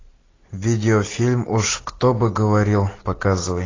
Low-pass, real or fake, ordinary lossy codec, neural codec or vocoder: 7.2 kHz; real; AAC, 32 kbps; none